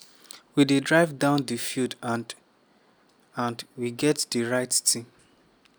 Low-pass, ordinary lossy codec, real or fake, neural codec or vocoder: none; none; real; none